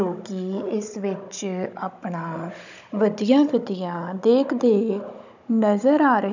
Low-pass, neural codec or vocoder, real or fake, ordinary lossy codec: 7.2 kHz; codec, 16 kHz, 16 kbps, FunCodec, trained on Chinese and English, 50 frames a second; fake; none